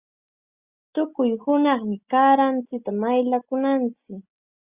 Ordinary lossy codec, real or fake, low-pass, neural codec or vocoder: Opus, 64 kbps; real; 3.6 kHz; none